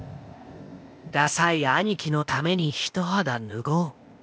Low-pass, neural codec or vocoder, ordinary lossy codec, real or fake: none; codec, 16 kHz, 0.8 kbps, ZipCodec; none; fake